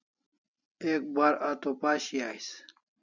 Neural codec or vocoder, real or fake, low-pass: none; real; 7.2 kHz